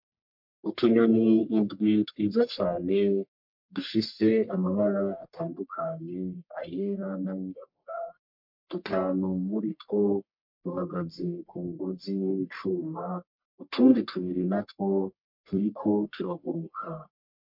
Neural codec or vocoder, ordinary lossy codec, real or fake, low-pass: codec, 44.1 kHz, 1.7 kbps, Pupu-Codec; MP3, 48 kbps; fake; 5.4 kHz